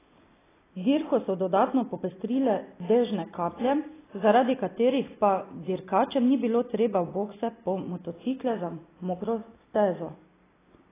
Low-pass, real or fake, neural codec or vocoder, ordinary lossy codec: 3.6 kHz; real; none; AAC, 16 kbps